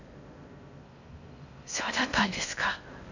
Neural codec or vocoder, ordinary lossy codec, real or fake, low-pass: codec, 16 kHz in and 24 kHz out, 0.6 kbps, FocalCodec, streaming, 4096 codes; none; fake; 7.2 kHz